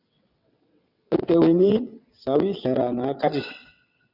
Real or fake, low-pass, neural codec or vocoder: fake; 5.4 kHz; vocoder, 22.05 kHz, 80 mel bands, WaveNeXt